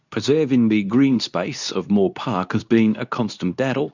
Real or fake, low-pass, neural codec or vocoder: fake; 7.2 kHz; codec, 24 kHz, 0.9 kbps, WavTokenizer, medium speech release version 2